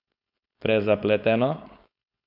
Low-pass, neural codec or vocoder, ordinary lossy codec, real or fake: 5.4 kHz; codec, 16 kHz, 4.8 kbps, FACodec; none; fake